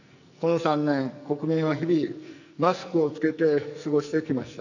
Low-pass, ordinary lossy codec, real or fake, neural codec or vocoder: 7.2 kHz; none; fake; codec, 44.1 kHz, 2.6 kbps, SNAC